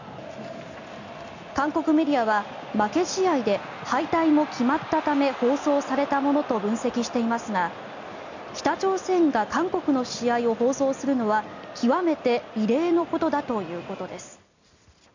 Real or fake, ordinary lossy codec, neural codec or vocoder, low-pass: real; none; none; 7.2 kHz